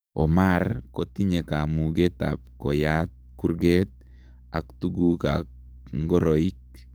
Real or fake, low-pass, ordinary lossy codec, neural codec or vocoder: fake; none; none; codec, 44.1 kHz, 7.8 kbps, DAC